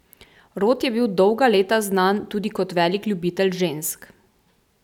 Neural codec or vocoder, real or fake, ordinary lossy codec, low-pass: none; real; none; 19.8 kHz